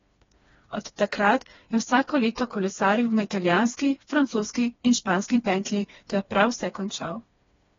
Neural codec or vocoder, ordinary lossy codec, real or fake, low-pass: codec, 16 kHz, 2 kbps, FreqCodec, smaller model; AAC, 24 kbps; fake; 7.2 kHz